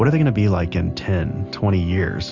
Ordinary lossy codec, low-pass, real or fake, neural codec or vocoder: Opus, 64 kbps; 7.2 kHz; real; none